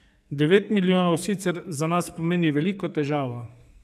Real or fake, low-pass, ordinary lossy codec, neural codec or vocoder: fake; 14.4 kHz; none; codec, 44.1 kHz, 2.6 kbps, SNAC